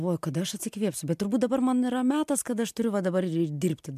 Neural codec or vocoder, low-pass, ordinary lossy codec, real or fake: none; 14.4 kHz; MP3, 96 kbps; real